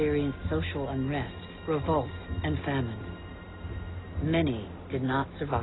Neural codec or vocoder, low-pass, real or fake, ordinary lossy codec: none; 7.2 kHz; real; AAC, 16 kbps